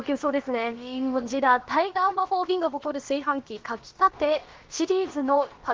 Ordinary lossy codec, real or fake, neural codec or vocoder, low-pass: Opus, 16 kbps; fake; codec, 16 kHz, about 1 kbps, DyCAST, with the encoder's durations; 7.2 kHz